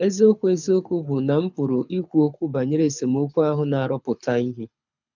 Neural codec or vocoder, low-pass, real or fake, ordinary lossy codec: codec, 24 kHz, 6 kbps, HILCodec; 7.2 kHz; fake; none